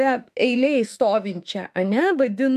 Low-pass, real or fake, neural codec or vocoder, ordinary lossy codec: 14.4 kHz; fake; autoencoder, 48 kHz, 32 numbers a frame, DAC-VAE, trained on Japanese speech; AAC, 96 kbps